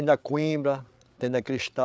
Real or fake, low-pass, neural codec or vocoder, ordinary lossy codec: fake; none; codec, 16 kHz, 8 kbps, FreqCodec, larger model; none